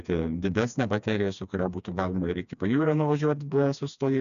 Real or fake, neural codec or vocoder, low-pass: fake; codec, 16 kHz, 2 kbps, FreqCodec, smaller model; 7.2 kHz